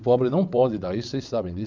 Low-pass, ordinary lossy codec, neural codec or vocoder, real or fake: 7.2 kHz; MP3, 64 kbps; vocoder, 22.05 kHz, 80 mel bands, WaveNeXt; fake